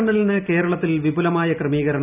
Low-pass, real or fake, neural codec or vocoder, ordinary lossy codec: 3.6 kHz; real; none; none